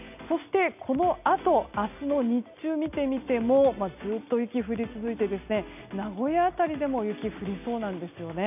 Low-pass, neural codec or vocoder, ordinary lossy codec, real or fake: 3.6 kHz; none; none; real